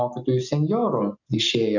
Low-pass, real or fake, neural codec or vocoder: 7.2 kHz; real; none